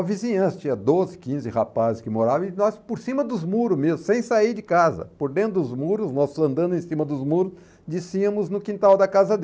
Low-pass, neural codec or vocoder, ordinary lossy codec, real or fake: none; none; none; real